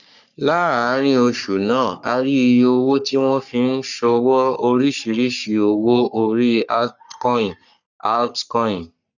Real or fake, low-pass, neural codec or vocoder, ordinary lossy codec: fake; 7.2 kHz; codec, 44.1 kHz, 3.4 kbps, Pupu-Codec; none